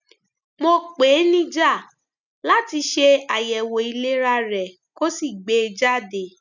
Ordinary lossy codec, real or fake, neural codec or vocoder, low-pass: none; real; none; 7.2 kHz